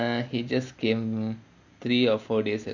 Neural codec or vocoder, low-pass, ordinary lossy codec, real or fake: none; 7.2 kHz; none; real